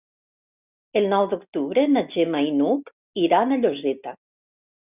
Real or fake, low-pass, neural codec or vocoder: real; 3.6 kHz; none